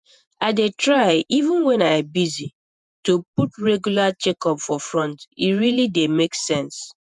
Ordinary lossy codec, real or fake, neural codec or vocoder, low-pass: none; fake; vocoder, 48 kHz, 128 mel bands, Vocos; 10.8 kHz